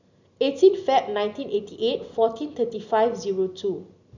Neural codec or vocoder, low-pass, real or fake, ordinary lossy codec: none; 7.2 kHz; real; none